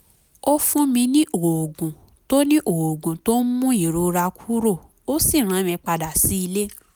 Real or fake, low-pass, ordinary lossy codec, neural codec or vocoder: real; none; none; none